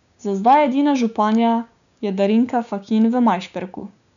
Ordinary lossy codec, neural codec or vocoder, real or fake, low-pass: none; codec, 16 kHz, 6 kbps, DAC; fake; 7.2 kHz